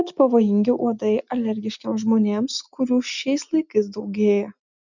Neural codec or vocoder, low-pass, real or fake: none; 7.2 kHz; real